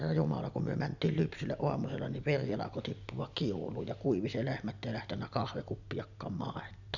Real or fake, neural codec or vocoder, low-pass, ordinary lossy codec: real; none; 7.2 kHz; none